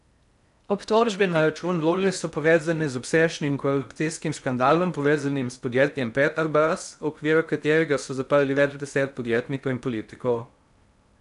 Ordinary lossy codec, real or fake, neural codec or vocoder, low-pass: none; fake; codec, 16 kHz in and 24 kHz out, 0.6 kbps, FocalCodec, streaming, 2048 codes; 10.8 kHz